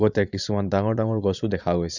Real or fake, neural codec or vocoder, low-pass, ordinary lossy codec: fake; vocoder, 22.05 kHz, 80 mel bands, Vocos; 7.2 kHz; none